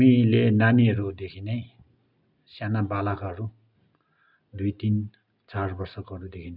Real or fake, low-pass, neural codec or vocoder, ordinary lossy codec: real; 5.4 kHz; none; none